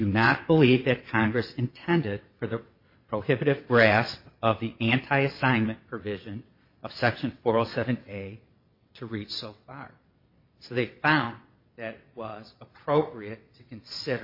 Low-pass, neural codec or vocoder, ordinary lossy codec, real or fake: 5.4 kHz; vocoder, 22.05 kHz, 80 mel bands, WaveNeXt; MP3, 32 kbps; fake